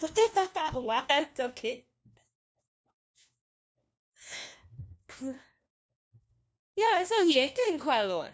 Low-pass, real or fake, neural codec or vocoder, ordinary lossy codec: none; fake; codec, 16 kHz, 1 kbps, FunCodec, trained on LibriTTS, 50 frames a second; none